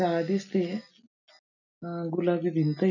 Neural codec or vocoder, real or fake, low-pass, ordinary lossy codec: none; real; 7.2 kHz; none